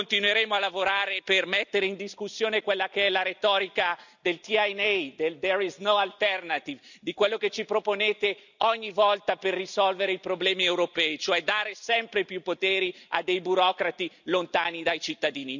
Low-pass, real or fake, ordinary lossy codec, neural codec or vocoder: 7.2 kHz; real; none; none